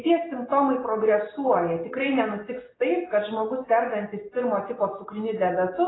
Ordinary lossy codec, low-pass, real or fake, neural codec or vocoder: AAC, 16 kbps; 7.2 kHz; real; none